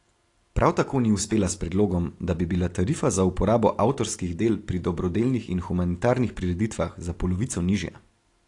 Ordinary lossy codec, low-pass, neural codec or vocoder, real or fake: AAC, 48 kbps; 10.8 kHz; none; real